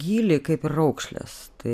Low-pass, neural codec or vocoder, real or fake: 14.4 kHz; none; real